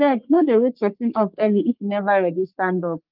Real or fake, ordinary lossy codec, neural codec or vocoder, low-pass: fake; Opus, 24 kbps; codec, 44.1 kHz, 3.4 kbps, Pupu-Codec; 5.4 kHz